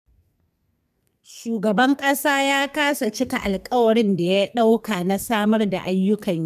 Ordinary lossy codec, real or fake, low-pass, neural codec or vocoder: none; fake; 14.4 kHz; codec, 44.1 kHz, 2.6 kbps, SNAC